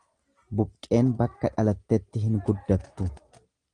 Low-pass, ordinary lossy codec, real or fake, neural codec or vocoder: 9.9 kHz; Opus, 24 kbps; real; none